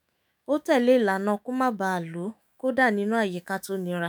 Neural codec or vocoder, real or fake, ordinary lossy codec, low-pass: autoencoder, 48 kHz, 128 numbers a frame, DAC-VAE, trained on Japanese speech; fake; none; none